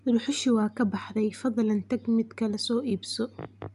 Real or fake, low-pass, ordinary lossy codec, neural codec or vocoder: real; 10.8 kHz; none; none